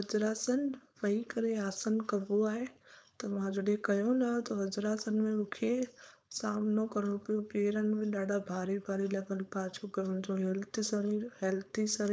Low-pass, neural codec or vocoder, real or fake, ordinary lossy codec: none; codec, 16 kHz, 4.8 kbps, FACodec; fake; none